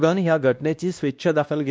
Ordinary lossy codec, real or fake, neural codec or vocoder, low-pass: none; fake; codec, 16 kHz, 1 kbps, X-Codec, WavLM features, trained on Multilingual LibriSpeech; none